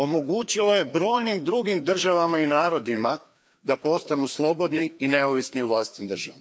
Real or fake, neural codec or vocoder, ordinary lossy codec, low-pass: fake; codec, 16 kHz, 2 kbps, FreqCodec, larger model; none; none